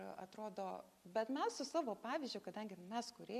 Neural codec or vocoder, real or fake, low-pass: none; real; 14.4 kHz